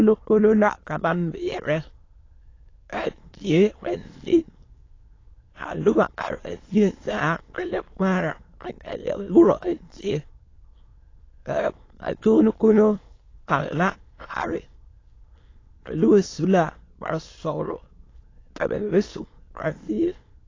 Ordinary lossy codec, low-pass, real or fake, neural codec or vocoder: AAC, 32 kbps; 7.2 kHz; fake; autoencoder, 22.05 kHz, a latent of 192 numbers a frame, VITS, trained on many speakers